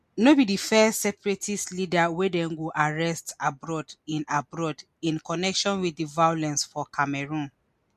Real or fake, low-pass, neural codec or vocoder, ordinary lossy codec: real; 14.4 kHz; none; MP3, 64 kbps